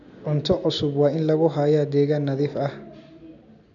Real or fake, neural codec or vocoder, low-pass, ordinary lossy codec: real; none; 7.2 kHz; none